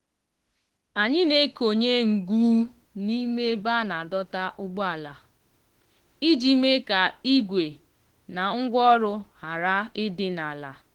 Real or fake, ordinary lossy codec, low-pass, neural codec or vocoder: fake; Opus, 16 kbps; 19.8 kHz; autoencoder, 48 kHz, 32 numbers a frame, DAC-VAE, trained on Japanese speech